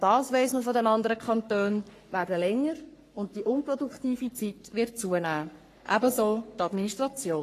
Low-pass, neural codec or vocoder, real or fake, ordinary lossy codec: 14.4 kHz; codec, 44.1 kHz, 3.4 kbps, Pupu-Codec; fake; AAC, 48 kbps